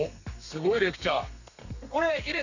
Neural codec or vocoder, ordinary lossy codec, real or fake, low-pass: codec, 32 kHz, 1.9 kbps, SNAC; none; fake; 7.2 kHz